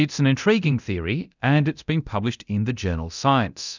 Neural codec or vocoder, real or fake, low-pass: codec, 24 kHz, 0.9 kbps, DualCodec; fake; 7.2 kHz